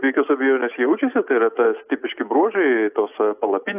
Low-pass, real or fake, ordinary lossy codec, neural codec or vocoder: 3.6 kHz; real; AAC, 32 kbps; none